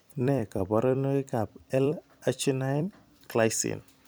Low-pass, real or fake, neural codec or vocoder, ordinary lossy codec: none; fake; vocoder, 44.1 kHz, 128 mel bands every 256 samples, BigVGAN v2; none